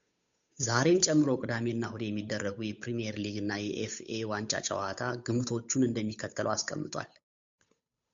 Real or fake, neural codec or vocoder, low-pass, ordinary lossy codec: fake; codec, 16 kHz, 8 kbps, FunCodec, trained on Chinese and English, 25 frames a second; 7.2 kHz; MP3, 64 kbps